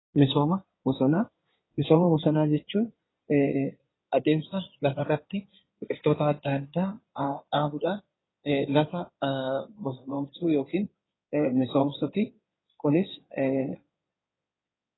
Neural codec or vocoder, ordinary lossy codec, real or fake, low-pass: codec, 16 kHz in and 24 kHz out, 2.2 kbps, FireRedTTS-2 codec; AAC, 16 kbps; fake; 7.2 kHz